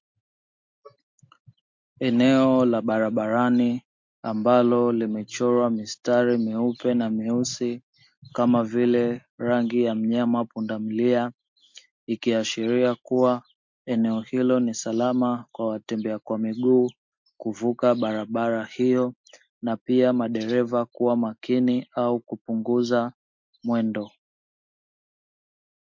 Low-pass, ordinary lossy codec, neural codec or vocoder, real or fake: 7.2 kHz; MP3, 48 kbps; none; real